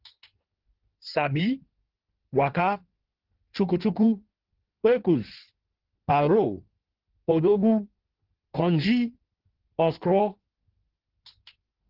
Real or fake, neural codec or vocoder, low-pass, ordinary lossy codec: fake; codec, 16 kHz in and 24 kHz out, 1.1 kbps, FireRedTTS-2 codec; 5.4 kHz; Opus, 16 kbps